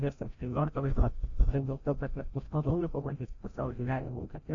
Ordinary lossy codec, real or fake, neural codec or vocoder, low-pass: AAC, 48 kbps; fake; codec, 16 kHz, 0.5 kbps, FreqCodec, larger model; 7.2 kHz